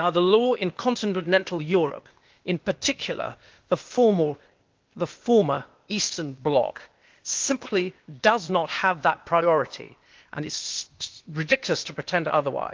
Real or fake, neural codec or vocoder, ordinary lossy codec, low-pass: fake; codec, 16 kHz, 0.8 kbps, ZipCodec; Opus, 24 kbps; 7.2 kHz